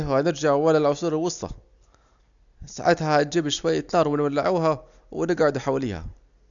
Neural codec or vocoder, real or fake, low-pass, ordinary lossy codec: none; real; 7.2 kHz; none